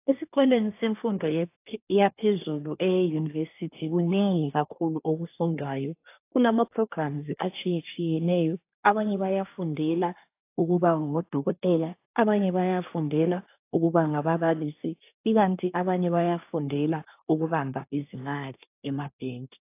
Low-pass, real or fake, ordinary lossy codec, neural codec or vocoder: 3.6 kHz; fake; AAC, 24 kbps; codec, 24 kHz, 1 kbps, SNAC